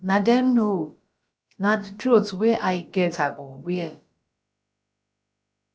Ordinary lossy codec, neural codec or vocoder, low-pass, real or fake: none; codec, 16 kHz, about 1 kbps, DyCAST, with the encoder's durations; none; fake